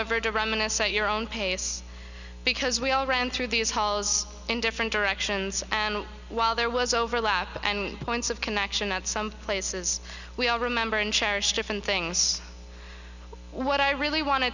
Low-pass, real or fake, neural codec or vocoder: 7.2 kHz; real; none